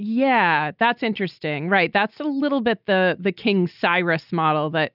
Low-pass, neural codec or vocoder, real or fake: 5.4 kHz; none; real